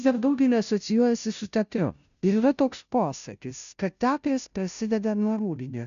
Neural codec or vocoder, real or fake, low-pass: codec, 16 kHz, 0.5 kbps, FunCodec, trained on Chinese and English, 25 frames a second; fake; 7.2 kHz